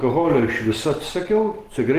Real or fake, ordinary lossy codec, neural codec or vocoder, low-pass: real; Opus, 16 kbps; none; 14.4 kHz